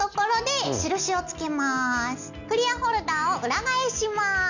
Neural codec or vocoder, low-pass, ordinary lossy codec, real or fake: none; 7.2 kHz; none; real